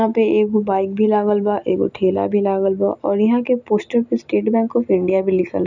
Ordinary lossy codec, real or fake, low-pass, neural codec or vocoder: none; real; 7.2 kHz; none